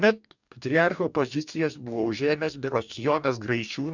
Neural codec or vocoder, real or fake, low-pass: codec, 44.1 kHz, 2.6 kbps, DAC; fake; 7.2 kHz